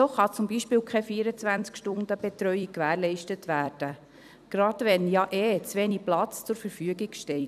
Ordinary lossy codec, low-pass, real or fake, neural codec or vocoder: none; 14.4 kHz; fake; vocoder, 44.1 kHz, 128 mel bands every 256 samples, BigVGAN v2